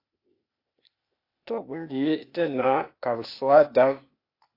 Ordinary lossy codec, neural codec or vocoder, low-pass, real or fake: AAC, 32 kbps; codec, 16 kHz, 0.8 kbps, ZipCodec; 5.4 kHz; fake